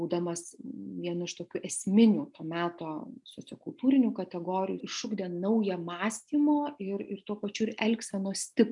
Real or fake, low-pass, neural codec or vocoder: real; 10.8 kHz; none